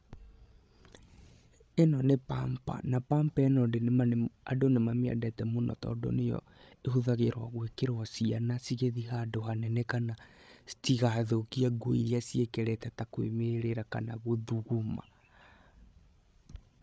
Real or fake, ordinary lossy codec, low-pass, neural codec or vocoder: fake; none; none; codec, 16 kHz, 16 kbps, FreqCodec, larger model